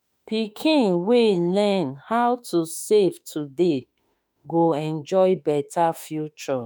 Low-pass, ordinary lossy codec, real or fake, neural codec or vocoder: none; none; fake; autoencoder, 48 kHz, 32 numbers a frame, DAC-VAE, trained on Japanese speech